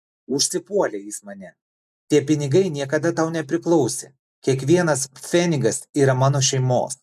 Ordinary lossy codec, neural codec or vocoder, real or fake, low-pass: MP3, 96 kbps; none; real; 14.4 kHz